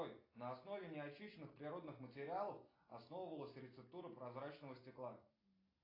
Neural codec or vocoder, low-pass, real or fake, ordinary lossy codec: none; 5.4 kHz; real; AAC, 24 kbps